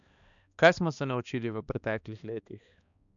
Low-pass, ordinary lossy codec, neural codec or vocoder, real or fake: 7.2 kHz; none; codec, 16 kHz, 2 kbps, X-Codec, HuBERT features, trained on balanced general audio; fake